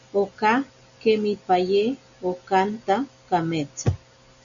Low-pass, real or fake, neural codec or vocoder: 7.2 kHz; real; none